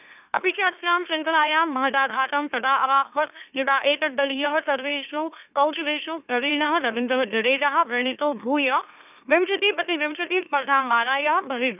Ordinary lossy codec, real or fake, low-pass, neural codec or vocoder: none; fake; 3.6 kHz; autoencoder, 44.1 kHz, a latent of 192 numbers a frame, MeloTTS